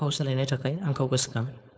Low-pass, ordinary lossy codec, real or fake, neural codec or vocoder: none; none; fake; codec, 16 kHz, 4.8 kbps, FACodec